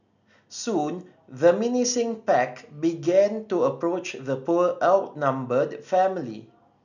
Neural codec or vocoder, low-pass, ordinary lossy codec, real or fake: none; 7.2 kHz; none; real